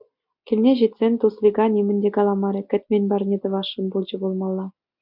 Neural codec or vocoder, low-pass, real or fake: none; 5.4 kHz; real